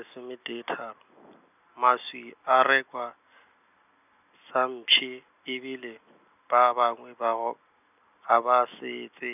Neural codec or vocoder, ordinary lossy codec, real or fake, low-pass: none; none; real; 3.6 kHz